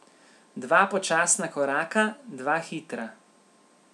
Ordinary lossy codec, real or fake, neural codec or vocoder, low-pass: none; real; none; none